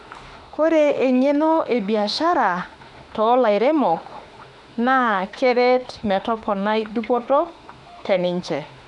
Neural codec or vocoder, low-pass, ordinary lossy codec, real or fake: autoencoder, 48 kHz, 32 numbers a frame, DAC-VAE, trained on Japanese speech; 10.8 kHz; none; fake